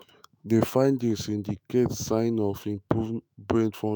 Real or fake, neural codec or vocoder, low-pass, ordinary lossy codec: fake; autoencoder, 48 kHz, 128 numbers a frame, DAC-VAE, trained on Japanese speech; none; none